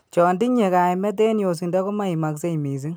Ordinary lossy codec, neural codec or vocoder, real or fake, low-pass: none; none; real; none